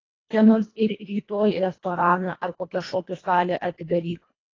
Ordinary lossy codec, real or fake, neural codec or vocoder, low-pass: AAC, 32 kbps; fake; codec, 24 kHz, 1.5 kbps, HILCodec; 7.2 kHz